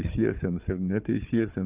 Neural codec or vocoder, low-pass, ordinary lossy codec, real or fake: codec, 16 kHz, 4 kbps, FunCodec, trained on Chinese and English, 50 frames a second; 3.6 kHz; Opus, 24 kbps; fake